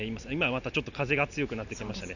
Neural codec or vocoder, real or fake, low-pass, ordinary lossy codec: none; real; 7.2 kHz; none